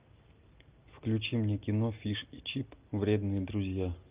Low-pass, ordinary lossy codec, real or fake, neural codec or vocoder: 3.6 kHz; Opus, 24 kbps; real; none